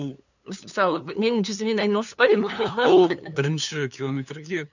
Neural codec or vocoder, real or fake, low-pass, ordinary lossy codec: codec, 16 kHz, 2 kbps, FunCodec, trained on LibriTTS, 25 frames a second; fake; 7.2 kHz; none